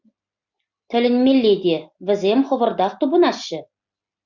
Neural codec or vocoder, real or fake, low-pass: none; real; 7.2 kHz